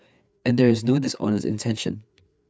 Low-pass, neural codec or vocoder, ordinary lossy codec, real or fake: none; codec, 16 kHz, 4 kbps, FreqCodec, larger model; none; fake